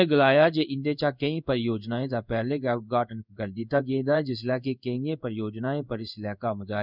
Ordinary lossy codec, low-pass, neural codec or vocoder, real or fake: none; 5.4 kHz; codec, 16 kHz in and 24 kHz out, 1 kbps, XY-Tokenizer; fake